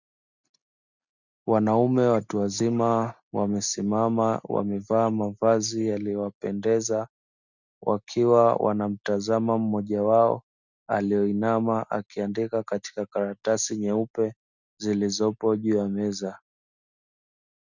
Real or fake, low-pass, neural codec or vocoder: real; 7.2 kHz; none